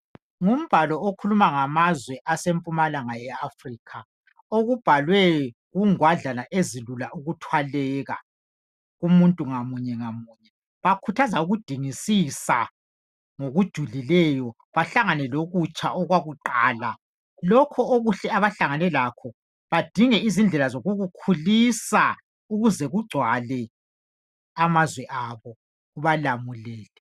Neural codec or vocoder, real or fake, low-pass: none; real; 14.4 kHz